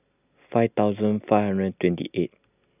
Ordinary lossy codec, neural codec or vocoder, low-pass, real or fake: none; none; 3.6 kHz; real